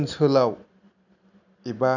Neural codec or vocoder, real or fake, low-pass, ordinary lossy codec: none; real; 7.2 kHz; none